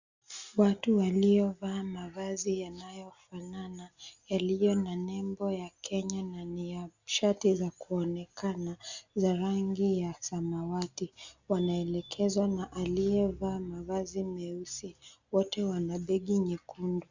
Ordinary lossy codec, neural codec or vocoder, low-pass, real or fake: Opus, 64 kbps; none; 7.2 kHz; real